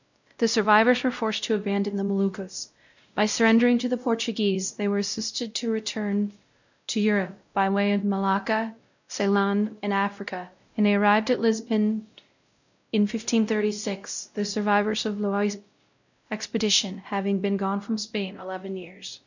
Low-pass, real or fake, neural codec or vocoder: 7.2 kHz; fake; codec, 16 kHz, 0.5 kbps, X-Codec, WavLM features, trained on Multilingual LibriSpeech